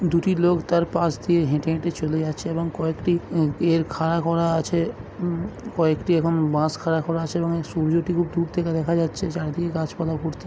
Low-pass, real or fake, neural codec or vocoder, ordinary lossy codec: none; real; none; none